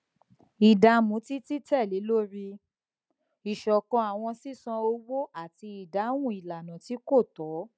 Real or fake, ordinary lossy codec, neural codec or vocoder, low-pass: real; none; none; none